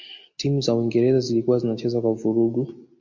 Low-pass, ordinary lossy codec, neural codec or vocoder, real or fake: 7.2 kHz; MP3, 48 kbps; none; real